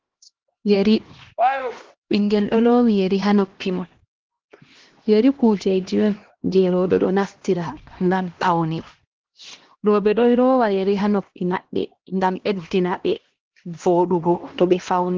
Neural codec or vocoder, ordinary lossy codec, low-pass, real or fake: codec, 16 kHz, 1 kbps, X-Codec, HuBERT features, trained on LibriSpeech; Opus, 16 kbps; 7.2 kHz; fake